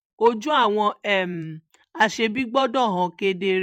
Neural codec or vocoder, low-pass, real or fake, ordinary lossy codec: vocoder, 44.1 kHz, 128 mel bands every 512 samples, BigVGAN v2; 19.8 kHz; fake; MP3, 64 kbps